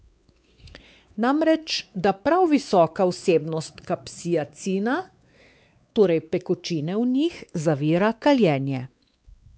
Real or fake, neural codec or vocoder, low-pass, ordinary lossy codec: fake; codec, 16 kHz, 4 kbps, X-Codec, WavLM features, trained on Multilingual LibriSpeech; none; none